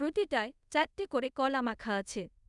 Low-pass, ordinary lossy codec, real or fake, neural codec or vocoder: 10.8 kHz; none; fake; codec, 24 kHz, 0.5 kbps, DualCodec